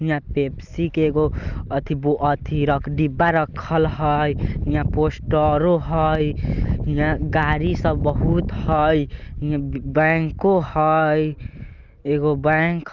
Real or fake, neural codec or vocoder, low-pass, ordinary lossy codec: real; none; 7.2 kHz; Opus, 24 kbps